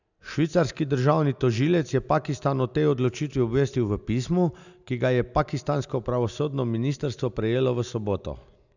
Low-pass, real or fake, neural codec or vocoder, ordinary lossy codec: 7.2 kHz; real; none; none